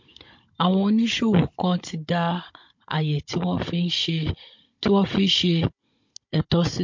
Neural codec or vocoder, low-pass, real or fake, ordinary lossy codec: codec, 16 kHz, 16 kbps, FunCodec, trained on Chinese and English, 50 frames a second; 7.2 kHz; fake; MP3, 48 kbps